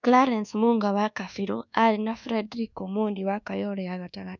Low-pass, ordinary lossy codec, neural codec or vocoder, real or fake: 7.2 kHz; none; codec, 24 kHz, 1.2 kbps, DualCodec; fake